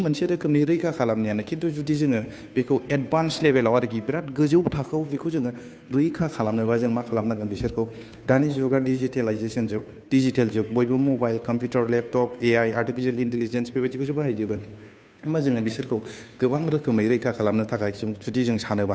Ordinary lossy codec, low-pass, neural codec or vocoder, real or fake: none; none; codec, 16 kHz, 2 kbps, FunCodec, trained on Chinese and English, 25 frames a second; fake